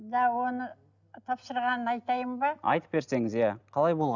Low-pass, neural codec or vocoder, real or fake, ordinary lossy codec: 7.2 kHz; none; real; none